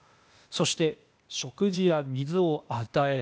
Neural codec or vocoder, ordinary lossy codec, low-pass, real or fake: codec, 16 kHz, 0.8 kbps, ZipCodec; none; none; fake